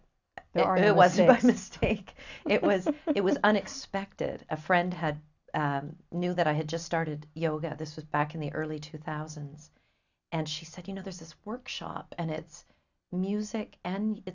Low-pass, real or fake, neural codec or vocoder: 7.2 kHz; real; none